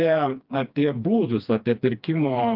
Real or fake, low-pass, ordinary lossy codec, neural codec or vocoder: fake; 5.4 kHz; Opus, 24 kbps; codec, 16 kHz, 2 kbps, FreqCodec, smaller model